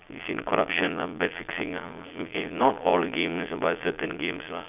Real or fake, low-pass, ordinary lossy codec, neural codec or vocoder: fake; 3.6 kHz; none; vocoder, 22.05 kHz, 80 mel bands, Vocos